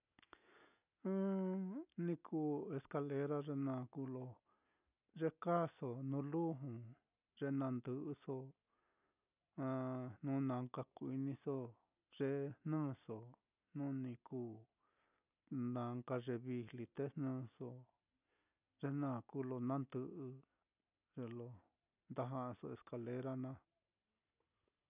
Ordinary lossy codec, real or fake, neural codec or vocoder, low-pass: none; real; none; 3.6 kHz